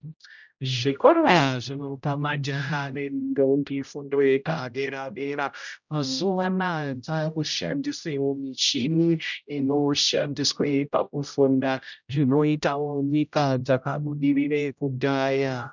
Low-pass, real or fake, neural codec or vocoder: 7.2 kHz; fake; codec, 16 kHz, 0.5 kbps, X-Codec, HuBERT features, trained on general audio